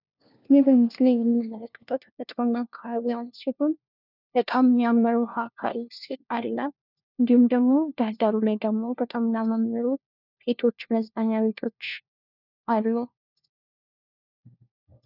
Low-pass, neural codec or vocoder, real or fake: 5.4 kHz; codec, 16 kHz, 1 kbps, FunCodec, trained on LibriTTS, 50 frames a second; fake